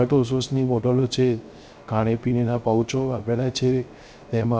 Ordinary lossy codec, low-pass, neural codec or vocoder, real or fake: none; none; codec, 16 kHz, 0.3 kbps, FocalCodec; fake